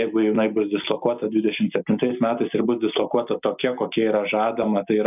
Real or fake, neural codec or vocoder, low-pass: real; none; 3.6 kHz